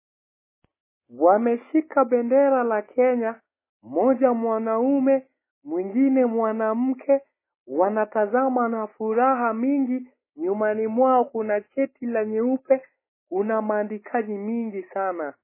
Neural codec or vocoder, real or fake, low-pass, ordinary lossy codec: none; real; 3.6 kHz; MP3, 16 kbps